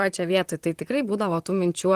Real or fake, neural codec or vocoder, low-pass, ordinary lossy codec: fake; vocoder, 44.1 kHz, 128 mel bands, Pupu-Vocoder; 14.4 kHz; Opus, 24 kbps